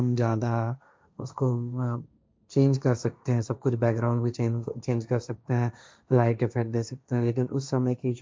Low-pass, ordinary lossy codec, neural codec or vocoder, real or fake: none; none; codec, 16 kHz, 1.1 kbps, Voila-Tokenizer; fake